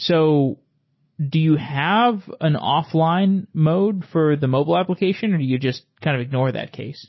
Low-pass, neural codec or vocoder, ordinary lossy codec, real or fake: 7.2 kHz; none; MP3, 24 kbps; real